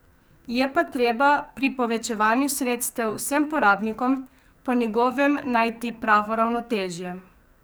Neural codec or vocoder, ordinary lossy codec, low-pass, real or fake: codec, 44.1 kHz, 2.6 kbps, SNAC; none; none; fake